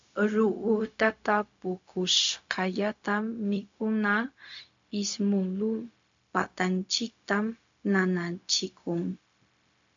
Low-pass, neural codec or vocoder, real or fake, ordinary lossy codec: 7.2 kHz; codec, 16 kHz, 0.4 kbps, LongCat-Audio-Codec; fake; AAC, 64 kbps